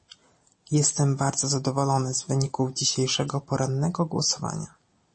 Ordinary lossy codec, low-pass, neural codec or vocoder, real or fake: MP3, 32 kbps; 9.9 kHz; none; real